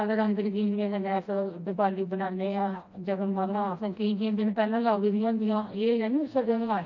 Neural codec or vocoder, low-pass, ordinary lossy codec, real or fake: codec, 16 kHz, 1 kbps, FreqCodec, smaller model; 7.2 kHz; MP3, 48 kbps; fake